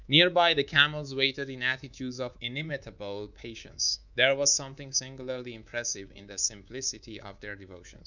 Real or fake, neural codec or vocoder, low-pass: fake; codec, 24 kHz, 3.1 kbps, DualCodec; 7.2 kHz